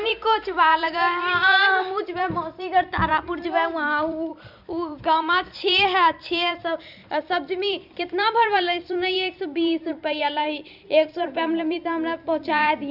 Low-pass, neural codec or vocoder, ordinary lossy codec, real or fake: 5.4 kHz; vocoder, 44.1 kHz, 128 mel bands every 256 samples, BigVGAN v2; none; fake